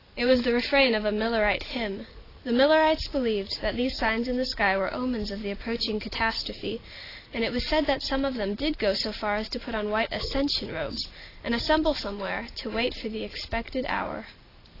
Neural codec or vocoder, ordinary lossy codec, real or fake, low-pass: none; AAC, 24 kbps; real; 5.4 kHz